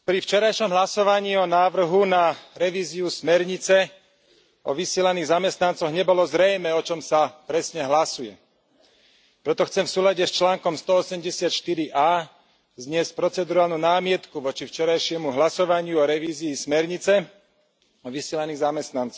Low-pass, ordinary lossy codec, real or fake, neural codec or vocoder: none; none; real; none